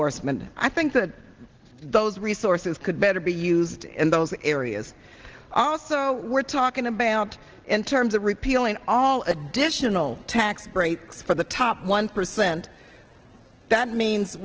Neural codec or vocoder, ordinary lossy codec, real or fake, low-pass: none; Opus, 16 kbps; real; 7.2 kHz